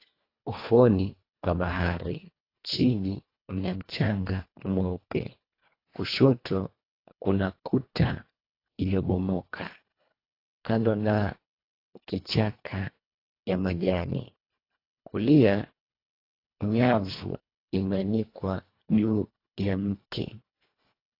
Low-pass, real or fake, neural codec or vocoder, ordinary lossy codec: 5.4 kHz; fake; codec, 24 kHz, 1.5 kbps, HILCodec; AAC, 32 kbps